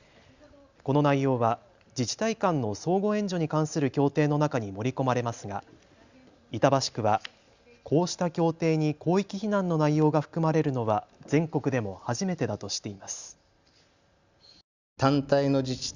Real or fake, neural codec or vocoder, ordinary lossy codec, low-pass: real; none; Opus, 64 kbps; 7.2 kHz